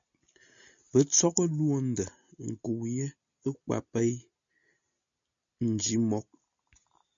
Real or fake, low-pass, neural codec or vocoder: real; 7.2 kHz; none